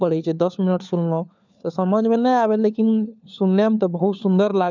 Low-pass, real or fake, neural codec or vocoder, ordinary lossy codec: 7.2 kHz; fake; codec, 16 kHz, 4 kbps, FunCodec, trained on LibriTTS, 50 frames a second; none